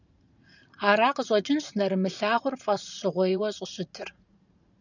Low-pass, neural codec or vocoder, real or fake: 7.2 kHz; vocoder, 44.1 kHz, 80 mel bands, Vocos; fake